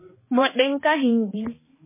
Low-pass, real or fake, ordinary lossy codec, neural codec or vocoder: 3.6 kHz; fake; MP3, 16 kbps; codec, 16 kHz, 2 kbps, X-Codec, HuBERT features, trained on balanced general audio